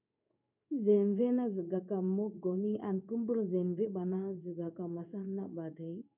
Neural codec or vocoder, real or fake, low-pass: codec, 16 kHz in and 24 kHz out, 1 kbps, XY-Tokenizer; fake; 3.6 kHz